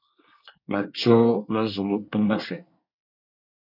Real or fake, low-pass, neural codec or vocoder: fake; 5.4 kHz; codec, 24 kHz, 1 kbps, SNAC